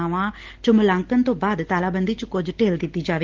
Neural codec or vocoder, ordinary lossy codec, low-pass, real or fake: none; Opus, 16 kbps; 7.2 kHz; real